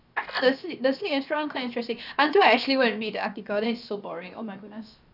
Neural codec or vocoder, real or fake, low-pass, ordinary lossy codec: codec, 16 kHz, about 1 kbps, DyCAST, with the encoder's durations; fake; 5.4 kHz; none